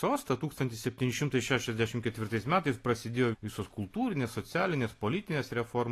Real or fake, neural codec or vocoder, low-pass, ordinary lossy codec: real; none; 14.4 kHz; AAC, 48 kbps